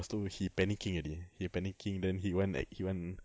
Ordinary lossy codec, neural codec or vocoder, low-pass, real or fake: none; none; none; real